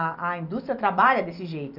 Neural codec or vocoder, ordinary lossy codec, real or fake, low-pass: none; none; real; 5.4 kHz